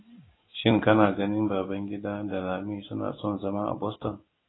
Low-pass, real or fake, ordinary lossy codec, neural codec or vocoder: 7.2 kHz; real; AAC, 16 kbps; none